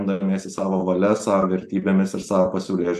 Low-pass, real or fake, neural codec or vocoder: 14.4 kHz; real; none